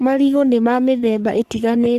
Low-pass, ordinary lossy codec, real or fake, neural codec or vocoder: 14.4 kHz; Opus, 64 kbps; fake; codec, 44.1 kHz, 3.4 kbps, Pupu-Codec